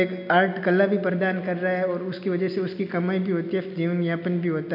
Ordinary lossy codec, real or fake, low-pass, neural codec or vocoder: none; real; 5.4 kHz; none